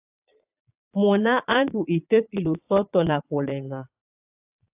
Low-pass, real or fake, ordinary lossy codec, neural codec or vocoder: 3.6 kHz; fake; AAC, 32 kbps; vocoder, 22.05 kHz, 80 mel bands, Vocos